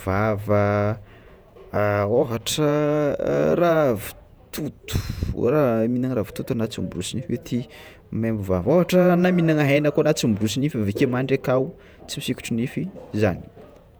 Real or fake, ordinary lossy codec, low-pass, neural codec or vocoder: fake; none; none; vocoder, 48 kHz, 128 mel bands, Vocos